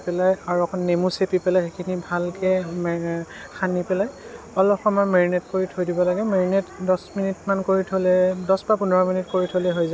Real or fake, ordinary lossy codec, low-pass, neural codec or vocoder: real; none; none; none